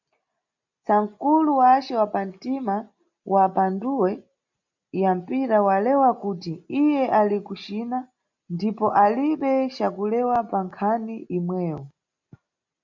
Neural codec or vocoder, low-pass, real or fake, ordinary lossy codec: none; 7.2 kHz; real; MP3, 64 kbps